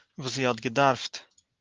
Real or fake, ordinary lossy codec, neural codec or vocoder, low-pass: real; Opus, 32 kbps; none; 7.2 kHz